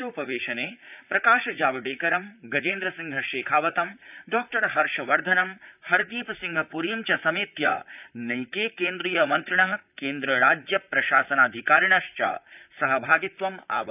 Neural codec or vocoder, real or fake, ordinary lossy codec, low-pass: vocoder, 44.1 kHz, 128 mel bands, Pupu-Vocoder; fake; none; 3.6 kHz